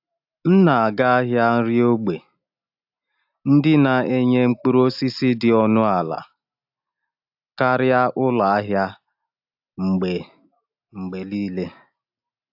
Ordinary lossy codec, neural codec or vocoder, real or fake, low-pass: AAC, 48 kbps; none; real; 5.4 kHz